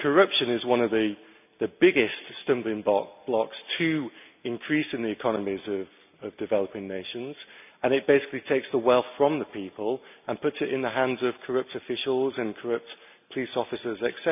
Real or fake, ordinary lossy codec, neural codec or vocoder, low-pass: real; none; none; 3.6 kHz